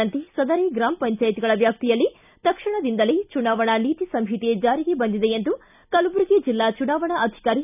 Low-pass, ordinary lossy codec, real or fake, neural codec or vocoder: 3.6 kHz; none; real; none